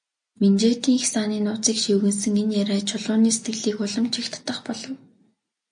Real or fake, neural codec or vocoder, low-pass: real; none; 9.9 kHz